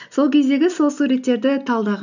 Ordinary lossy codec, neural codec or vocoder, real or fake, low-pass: none; none; real; 7.2 kHz